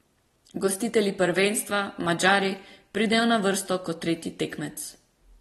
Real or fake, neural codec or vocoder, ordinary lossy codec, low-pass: real; none; AAC, 32 kbps; 19.8 kHz